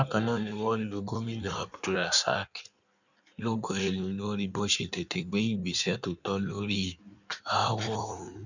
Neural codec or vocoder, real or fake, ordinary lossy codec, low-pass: codec, 16 kHz in and 24 kHz out, 1.1 kbps, FireRedTTS-2 codec; fake; none; 7.2 kHz